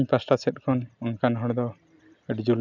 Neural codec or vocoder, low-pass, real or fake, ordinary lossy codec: none; 7.2 kHz; real; none